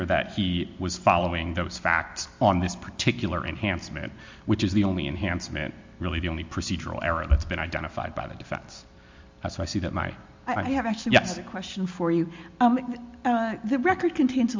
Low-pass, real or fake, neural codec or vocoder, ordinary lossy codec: 7.2 kHz; real; none; MP3, 64 kbps